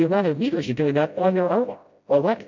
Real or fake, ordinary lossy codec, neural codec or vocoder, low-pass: fake; MP3, 64 kbps; codec, 16 kHz, 0.5 kbps, FreqCodec, smaller model; 7.2 kHz